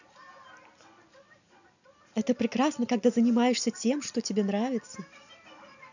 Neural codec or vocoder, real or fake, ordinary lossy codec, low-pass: none; real; none; 7.2 kHz